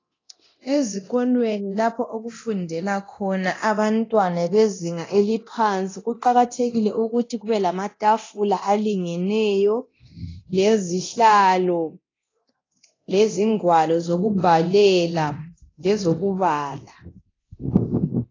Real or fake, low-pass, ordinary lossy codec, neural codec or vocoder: fake; 7.2 kHz; AAC, 32 kbps; codec, 24 kHz, 0.9 kbps, DualCodec